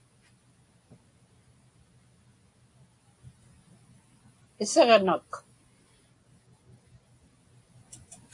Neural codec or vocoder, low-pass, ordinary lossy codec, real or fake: none; 10.8 kHz; AAC, 48 kbps; real